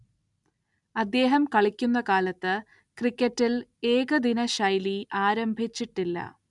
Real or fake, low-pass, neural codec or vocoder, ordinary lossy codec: real; 10.8 kHz; none; none